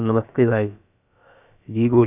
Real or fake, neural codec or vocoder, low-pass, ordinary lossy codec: fake; codec, 16 kHz, about 1 kbps, DyCAST, with the encoder's durations; 3.6 kHz; none